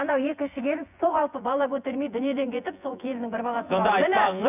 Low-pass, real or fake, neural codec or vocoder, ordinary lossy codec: 3.6 kHz; fake; vocoder, 24 kHz, 100 mel bands, Vocos; none